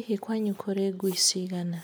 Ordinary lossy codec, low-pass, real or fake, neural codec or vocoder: none; none; real; none